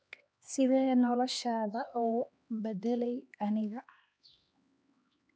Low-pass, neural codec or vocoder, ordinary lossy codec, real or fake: none; codec, 16 kHz, 2 kbps, X-Codec, HuBERT features, trained on LibriSpeech; none; fake